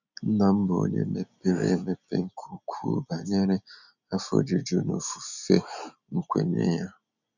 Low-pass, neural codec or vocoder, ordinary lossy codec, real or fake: 7.2 kHz; none; none; real